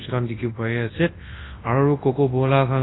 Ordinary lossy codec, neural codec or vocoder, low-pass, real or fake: AAC, 16 kbps; codec, 24 kHz, 0.9 kbps, WavTokenizer, large speech release; 7.2 kHz; fake